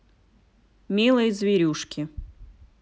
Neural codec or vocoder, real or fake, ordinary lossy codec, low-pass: none; real; none; none